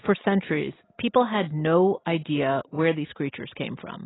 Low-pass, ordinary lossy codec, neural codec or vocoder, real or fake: 7.2 kHz; AAC, 16 kbps; none; real